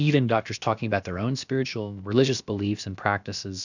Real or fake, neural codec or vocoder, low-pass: fake; codec, 16 kHz, about 1 kbps, DyCAST, with the encoder's durations; 7.2 kHz